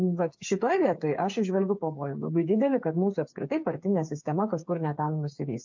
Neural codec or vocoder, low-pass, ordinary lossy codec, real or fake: codec, 16 kHz, 8 kbps, FreqCodec, smaller model; 7.2 kHz; MP3, 48 kbps; fake